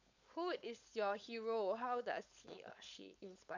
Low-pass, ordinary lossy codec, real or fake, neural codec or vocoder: 7.2 kHz; none; fake; codec, 16 kHz, 4.8 kbps, FACodec